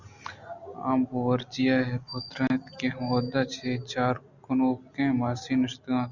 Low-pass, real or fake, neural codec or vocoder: 7.2 kHz; real; none